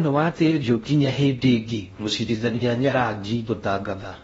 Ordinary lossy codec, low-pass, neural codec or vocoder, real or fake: AAC, 24 kbps; 10.8 kHz; codec, 16 kHz in and 24 kHz out, 0.6 kbps, FocalCodec, streaming, 2048 codes; fake